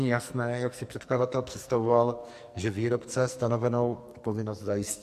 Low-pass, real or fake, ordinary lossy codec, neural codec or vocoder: 14.4 kHz; fake; MP3, 64 kbps; codec, 44.1 kHz, 2.6 kbps, SNAC